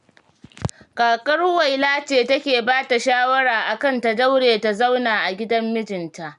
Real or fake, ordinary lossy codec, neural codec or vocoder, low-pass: real; none; none; 10.8 kHz